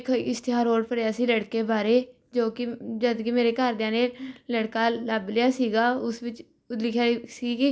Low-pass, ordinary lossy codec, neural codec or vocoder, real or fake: none; none; none; real